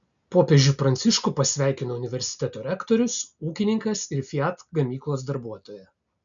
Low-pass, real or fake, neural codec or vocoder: 7.2 kHz; real; none